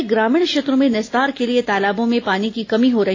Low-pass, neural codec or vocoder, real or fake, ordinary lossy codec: 7.2 kHz; none; real; AAC, 32 kbps